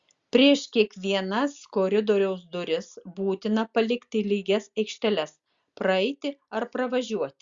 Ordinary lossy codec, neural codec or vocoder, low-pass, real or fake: Opus, 64 kbps; none; 7.2 kHz; real